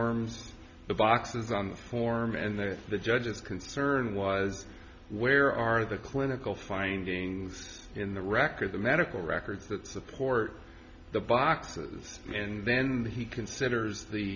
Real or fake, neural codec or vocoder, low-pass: real; none; 7.2 kHz